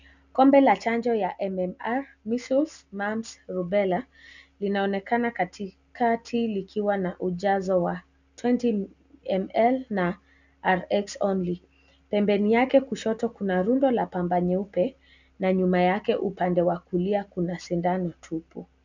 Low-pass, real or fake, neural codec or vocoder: 7.2 kHz; real; none